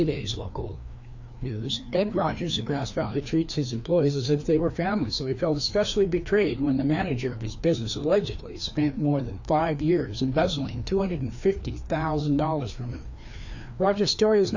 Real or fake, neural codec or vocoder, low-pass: fake; codec, 16 kHz, 2 kbps, FreqCodec, larger model; 7.2 kHz